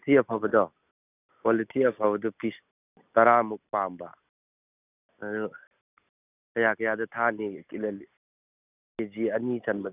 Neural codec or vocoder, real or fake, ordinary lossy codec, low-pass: none; real; none; 3.6 kHz